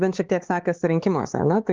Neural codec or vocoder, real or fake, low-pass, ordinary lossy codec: codec, 16 kHz, 4 kbps, X-Codec, HuBERT features, trained on LibriSpeech; fake; 7.2 kHz; Opus, 16 kbps